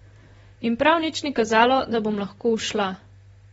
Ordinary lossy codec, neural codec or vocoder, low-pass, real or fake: AAC, 24 kbps; vocoder, 44.1 kHz, 128 mel bands, Pupu-Vocoder; 19.8 kHz; fake